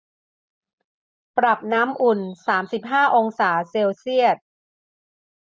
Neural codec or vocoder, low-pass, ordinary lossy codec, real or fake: none; none; none; real